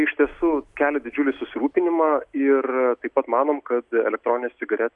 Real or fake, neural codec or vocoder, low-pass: real; none; 10.8 kHz